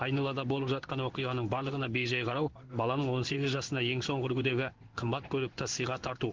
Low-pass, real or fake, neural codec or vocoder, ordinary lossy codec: 7.2 kHz; fake; codec, 16 kHz in and 24 kHz out, 1 kbps, XY-Tokenizer; Opus, 16 kbps